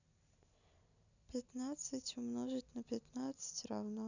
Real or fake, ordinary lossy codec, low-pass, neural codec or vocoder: real; none; 7.2 kHz; none